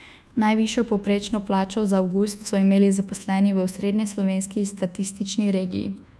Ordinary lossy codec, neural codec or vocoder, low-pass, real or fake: none; codec, 24 kHz, 1.2 kbps, DualCodec; none; fake